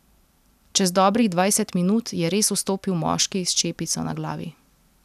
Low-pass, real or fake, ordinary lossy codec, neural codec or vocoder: 14.4 kHz; real; none; none